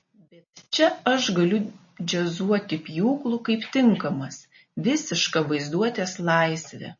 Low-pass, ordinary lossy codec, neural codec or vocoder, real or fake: 7.2 kHz; MP3, 32 kbps; none; real